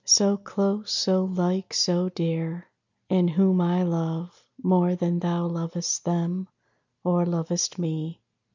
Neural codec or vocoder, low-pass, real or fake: none; 7.2 kHz; real